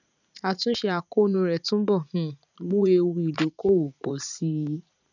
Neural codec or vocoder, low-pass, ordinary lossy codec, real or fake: vocoder, 44.1 kHz, 80 mel bands, Vocos; 7.2 kHz; none; fake